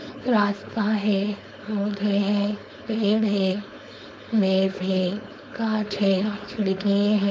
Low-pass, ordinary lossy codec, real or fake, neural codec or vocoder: none; none; fake; codec, 16 kHz, 4.8 kbps, FACodec